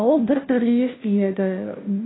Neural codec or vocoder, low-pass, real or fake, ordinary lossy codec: codec, 16 kHz, 0.5 kbps, FunCodec, trained on Chinese and English, 25 frames a second; 7.2 kHz; fake; AAC, 16 kbps